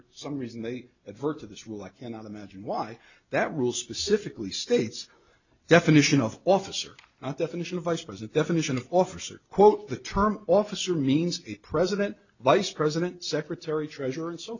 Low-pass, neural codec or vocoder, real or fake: 7.2 kHz; none; real